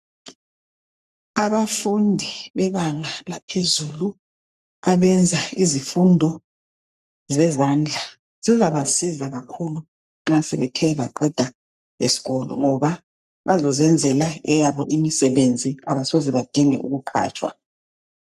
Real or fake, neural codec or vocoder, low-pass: fake; codec, 44.1 kHz, 3.4 kbps, Pupu-Codec; 14.4 kHz